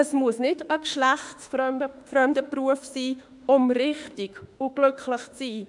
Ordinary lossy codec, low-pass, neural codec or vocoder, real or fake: none; 10.8 kHz; autoencoder, 48 kHz, 32 numbers a frame, DAC-VAE, trained on Japanese speech; fake